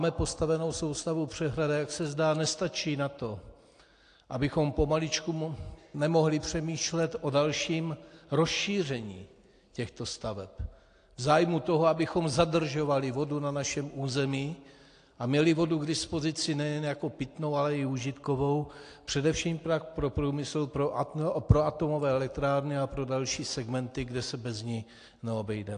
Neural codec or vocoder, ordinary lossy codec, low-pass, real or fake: none; AAC, 48 kbps; 9.9 kHz; real